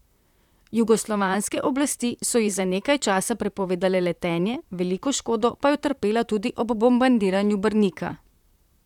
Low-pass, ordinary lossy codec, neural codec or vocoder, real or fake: 19.8 kHz; none; vocoder, 44.1 kHz, 128 mel bands, Pupu-Vocoder; fake